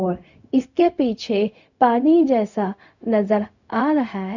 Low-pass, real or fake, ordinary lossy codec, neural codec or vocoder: 7.2 kHz; fake; none; codec, 16 kHz, 0.4 kbps, LongCat-Audio-Codec